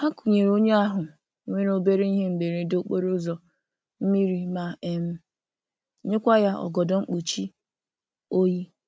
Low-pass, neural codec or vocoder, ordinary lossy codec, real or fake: none; none; none; real